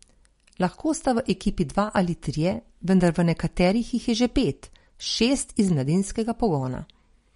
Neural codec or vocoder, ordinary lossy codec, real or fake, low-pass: none; MP3, 48 kbps; real; 10.8 kHz